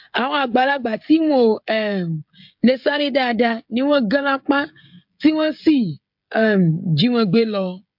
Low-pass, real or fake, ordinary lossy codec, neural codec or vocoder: 5.4 kHz; fake; MP3, 48 kbps; codec, 16 kHz, 16 kbps, FreqCodec, smaller model